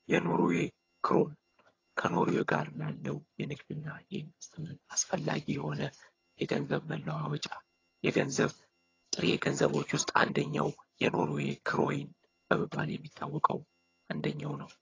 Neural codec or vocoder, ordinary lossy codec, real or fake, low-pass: vocoder, 22.05 kHz, 80 mel bands, HiFi-GAN; AAC, 32 kbps; fake; 7.2 kHz